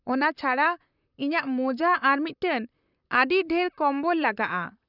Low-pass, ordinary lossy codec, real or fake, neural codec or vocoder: 5.4 kHz; none; fake; vocoder, 44.1 kHz, 128 mel bands, Pupu-Vocoder